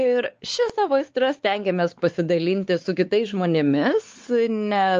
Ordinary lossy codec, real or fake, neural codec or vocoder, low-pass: Opus, 24 kbps; fake; codec, 16 kHz, 4 kbps, X-Codec, WavLM features, trained on Multilingual LibriSpeech; 7.2 kHz